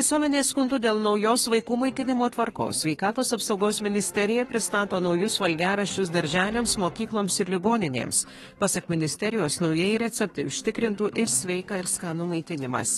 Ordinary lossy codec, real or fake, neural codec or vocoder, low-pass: AAC, 32 kbps; fake; codec, 32 kHz, 1.9 kbps, SNAC; 14.4 kHz